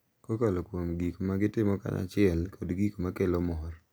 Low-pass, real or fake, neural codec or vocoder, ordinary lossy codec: none; real; none; none